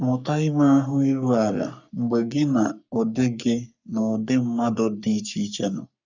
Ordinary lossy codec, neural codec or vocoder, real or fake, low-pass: none; codec, 44.1 kHz, 3.4 kbps, Pupu-Codec; fake; 7.2 kHz